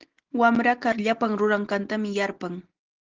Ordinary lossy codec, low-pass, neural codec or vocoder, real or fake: Opus, 16 kbps; 7.2 kHz; none; real